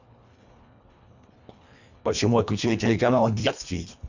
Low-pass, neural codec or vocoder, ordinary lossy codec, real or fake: 7.2 kHz; codec, 24 kHz, 1.5 kbps, HILCodec; none; fake